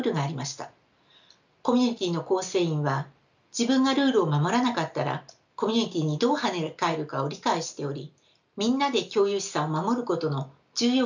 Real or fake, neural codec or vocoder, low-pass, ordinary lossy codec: real; none; 7.2 kHz; none